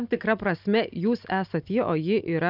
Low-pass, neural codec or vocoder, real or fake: 5.4 kHz; none; real